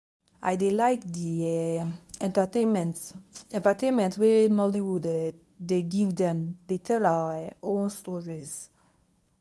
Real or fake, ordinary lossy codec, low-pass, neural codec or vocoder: fake; none; none; codec, 24 kHz, 0.9 kbps, WavTokenizer, medium speech release version 1